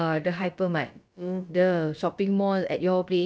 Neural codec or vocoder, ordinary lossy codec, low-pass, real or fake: codec, 16 kHz, about 1 kbps, DyCAST, with the encoder's durations; none; none; fake